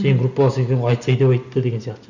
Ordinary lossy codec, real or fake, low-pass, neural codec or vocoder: none; real; 7.2 kHz; none